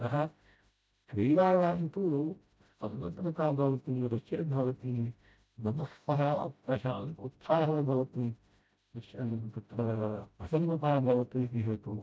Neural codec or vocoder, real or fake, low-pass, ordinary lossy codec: codec, 16 kHz, 0.5 kbps, FreqCodec, smaller model; fake; none; none